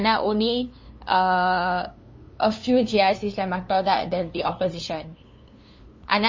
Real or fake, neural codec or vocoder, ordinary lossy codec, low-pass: fake; codec, 16 kHz, 2 kbps, FunCodec, trained on LibriTTS, 25 frames a second; MP3, 32 kbps; 7.2 kHz